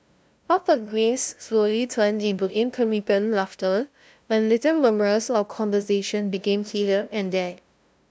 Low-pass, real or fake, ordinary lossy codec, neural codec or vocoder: none; fake; none; codec, 16 kHz, 0.5 kbps, FunCodec, trained on LibriTTS, 25 frames a second